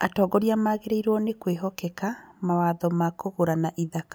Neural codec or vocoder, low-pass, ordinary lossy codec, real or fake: none; none; none; real